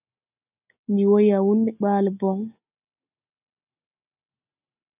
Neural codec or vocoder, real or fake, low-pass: none; real; 3.6 kHz